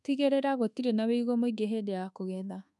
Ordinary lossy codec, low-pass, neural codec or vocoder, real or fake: none; none; codec, 24 kHz, 1.2 kbps, DualCodec; fake